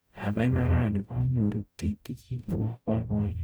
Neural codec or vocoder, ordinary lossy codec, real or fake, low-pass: codec, 44.1 kHz, 0.9 kbps, DAC; none; fake; none